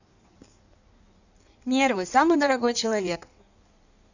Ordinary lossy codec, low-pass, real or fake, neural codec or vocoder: none; 7.2 kHz; fake; codec, 16 kHz in and 24 kHz out, 1.1 kbps, FireRedTTS-2 codec